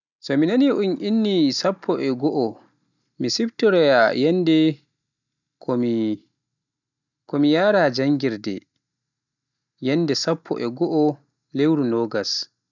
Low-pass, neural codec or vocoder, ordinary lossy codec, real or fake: 7.2 kHz; none; none; real